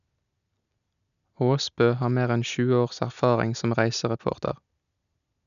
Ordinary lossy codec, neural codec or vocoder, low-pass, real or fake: none; none; 7.2 kHz; real